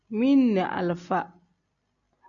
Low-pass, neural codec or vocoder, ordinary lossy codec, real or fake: 7.2 kHz; none; MP3, 96 kbps; real